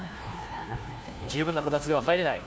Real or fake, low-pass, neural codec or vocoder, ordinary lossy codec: fake; none; codec, 16 kHz, 1 kbps, FunCodec, trained on LibriTTS, 50 frames a second; none